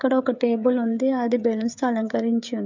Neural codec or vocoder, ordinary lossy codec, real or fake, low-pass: codec, 16 kHz, 16 kbps, FreqCodec, smaller model; MP3, 64 kbps; fake; 7.2 kHz